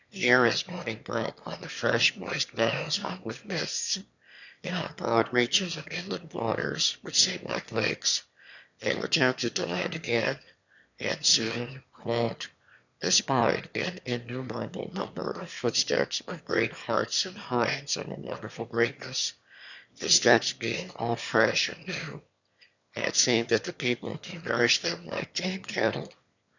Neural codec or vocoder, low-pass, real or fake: autoencoder, 22.05 kHz, a latent of 192 numbers a frame, VITS, trained on one speaker; 7.2 kHz; fake